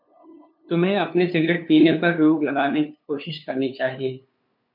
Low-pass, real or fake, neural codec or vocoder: 5.4 kHz; fake; codec, 16 kHz, 2 kbps, FunCodec, trained on LibriTTS, 25 frames a second